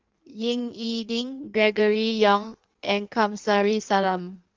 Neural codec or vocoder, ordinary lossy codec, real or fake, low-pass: codec, 16 kHz in and 24 kHz out, 1.1 kbps, FireRedTTS-2 codec; Opus, 32 kbps; fake; 7.2 kHz